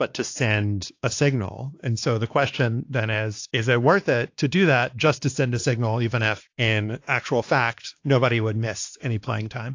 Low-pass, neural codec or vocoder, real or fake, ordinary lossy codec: 7.2 kHz; codec, 16 kHz, 2 kbps, X-Codec, WavLM features, trained on Multilingual LibriSpeech; fake; AAC, 48 kbps